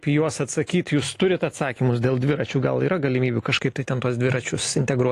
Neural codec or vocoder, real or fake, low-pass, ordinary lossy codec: none; real; 14.4 kHz; AAC, 48 kbps